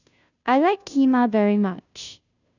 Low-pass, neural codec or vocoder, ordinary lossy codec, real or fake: 7.2 kHz; codec, 16 kHz, 0.5 kbps, FunCodec, trained on Chinese and English, 25 frames a second; none; fake